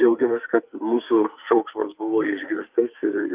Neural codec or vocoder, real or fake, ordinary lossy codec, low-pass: codec, 44.1 kHz, 2.6 kbps, SNAC; fake; Opus, 24 kbps; 3.6 kHz